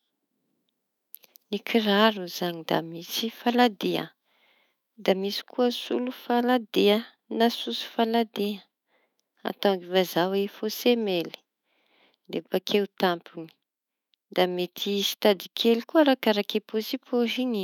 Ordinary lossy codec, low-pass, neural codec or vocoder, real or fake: none; 19.8 kHz; autoencoder, 48 kHz, 128 numbers a frame, DAC-VAE, trained on Japanese speech; fake